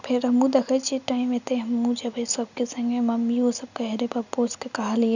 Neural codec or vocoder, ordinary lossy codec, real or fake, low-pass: none; none; real; 7.2 kHz